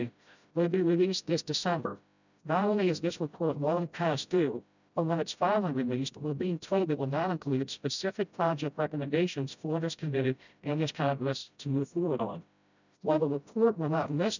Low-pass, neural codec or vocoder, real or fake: 7.2 kHz; codec, 16 kHz, 0.5 kbps, FreqCodec, smaller model; fake